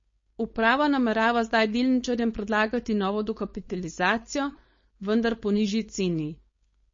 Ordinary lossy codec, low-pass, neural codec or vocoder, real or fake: MP3, 32 kbps; 7.2 kHz; codec, 16 kHz, 4.8 kbps, FACodec; fake